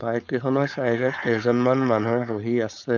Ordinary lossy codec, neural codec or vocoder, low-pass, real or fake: none; codec, 16 kHz, 4.8 kbps, FACodec; 7.2 kHz; fake